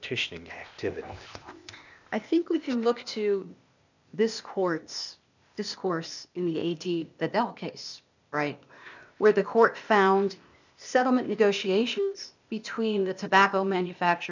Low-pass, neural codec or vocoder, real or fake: 7.2 kHz; codec, 16 kHz, 0.8 kbps, ZipCodec; fake